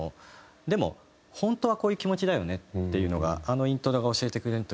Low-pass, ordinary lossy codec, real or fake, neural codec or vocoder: none; none; real; none